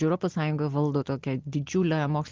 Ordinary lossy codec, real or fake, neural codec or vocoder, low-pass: Opus, 16 kbps; real; none; 7.2 kHz